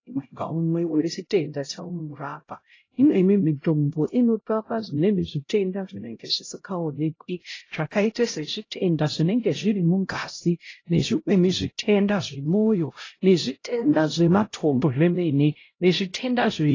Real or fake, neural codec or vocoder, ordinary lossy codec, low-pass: fake; codec, 16 kHz, 0.5 kbps, X-Codec, HuBERT features, trained on LibriSpeech; AAC, 32 kbps; 7.2 kHz